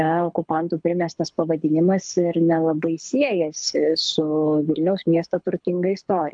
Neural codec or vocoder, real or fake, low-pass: codec, 24 kHz, 6 kbps, HILCodec; fake; 9.9 kHz